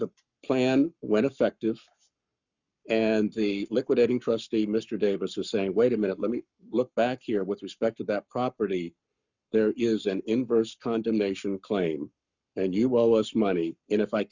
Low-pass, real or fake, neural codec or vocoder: 7.2 kHz; fake; codec, 44.1 kHz, 7.8 kbps, Pupu-Codec